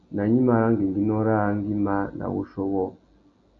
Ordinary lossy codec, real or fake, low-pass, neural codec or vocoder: Opus, 64 kbps; real; 7.2 kHz; none